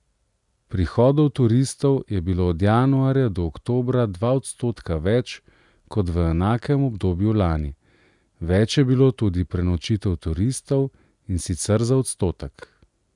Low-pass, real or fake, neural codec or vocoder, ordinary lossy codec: 10.8 kHz; real; none; none